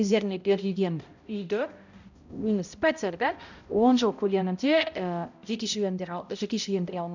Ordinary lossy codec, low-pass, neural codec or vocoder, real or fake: none; 7.2 kHz; codec, 16 kHz, 0.5 kbps, X-Codec, HuBERT features, trained on balanced general audio; fake